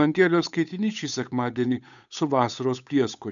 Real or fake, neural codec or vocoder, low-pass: fake; codec, 16 kHz, 8 kbps, FunCodec, trained on Chinese and English, 25 frames a second; 7.2 kHz